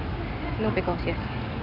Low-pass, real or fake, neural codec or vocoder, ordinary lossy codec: 5.4 kHz; real; none; none